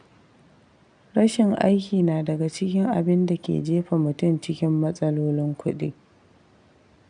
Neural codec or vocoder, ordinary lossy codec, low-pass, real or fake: none; none; 9.9 kHz; real